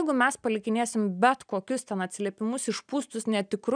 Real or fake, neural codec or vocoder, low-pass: real; none; 9.9 kHz